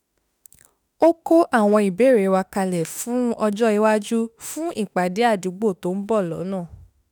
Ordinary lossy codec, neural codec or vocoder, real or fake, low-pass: none; autoencoder, 48 kHz, 32 numbers a frame, DAC-VAE, trained on Japanese speech; fake; none